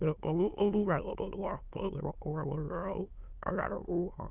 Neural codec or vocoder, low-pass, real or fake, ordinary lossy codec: autoencoder, 22.05 kHz, a latent of 192 numbers a frame, VITS, trained on many speakers; 3.6 kHz; fake; Opus, 32 kbps